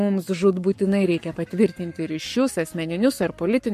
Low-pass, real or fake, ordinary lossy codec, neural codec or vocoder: 14.4 kHz; fake; MP3, 64 kbps; codec, 44.1 kHz, 7.8 kbps, Pupu-Codec